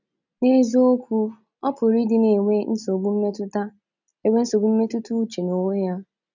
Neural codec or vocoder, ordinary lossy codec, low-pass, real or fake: none; none; 7.2 kHz; real